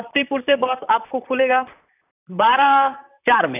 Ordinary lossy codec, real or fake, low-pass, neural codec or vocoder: none; fake; 3.6 kHz; vocoder, 44.1 kHz, 128 mel bands, Pupu-Vocoder